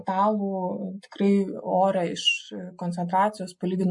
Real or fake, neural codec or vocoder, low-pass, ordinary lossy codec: real; none; 10.8 kHz; MP3, 48 kbps